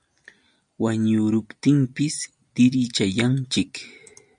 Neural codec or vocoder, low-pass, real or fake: none; 9.9 kHz; real